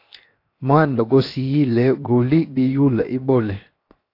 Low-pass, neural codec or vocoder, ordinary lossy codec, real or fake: 5.4 kHz; codec, 16 kHz, 0.7 kbps, FocalCodec; AAC, 32 kbps; fake